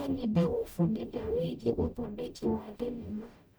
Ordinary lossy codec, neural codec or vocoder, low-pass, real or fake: none; codec, 44.1 kHz, 0.9 kbps, DAC; none; fake